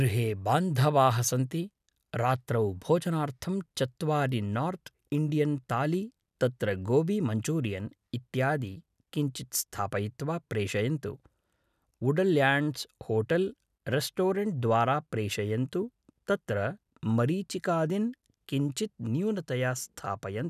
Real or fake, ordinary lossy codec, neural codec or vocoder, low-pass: real; none; none; 14.4 kHz